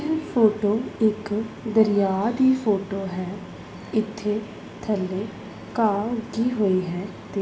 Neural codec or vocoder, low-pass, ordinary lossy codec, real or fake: none; none; none; real